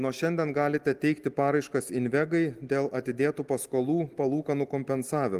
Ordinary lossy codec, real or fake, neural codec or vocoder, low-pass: Opus, 24 kbps; real; none; 14.4 kHz